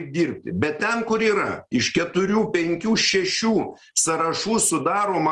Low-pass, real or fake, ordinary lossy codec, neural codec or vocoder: 10.8 kHz; real; Opus, 24 kbps; none